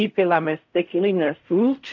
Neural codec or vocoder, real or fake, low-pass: codec, 16 kHz in and 24 kHz out, 0.4 kbps, LongCat-Audio-Codec, fine tuned four codebook decoder; fake; 7.2 kHz